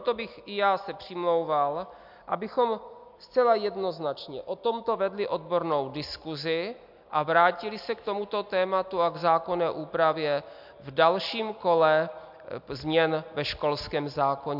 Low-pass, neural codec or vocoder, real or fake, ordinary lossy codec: 5.4 kHz; none; real; MP3, 48 kbps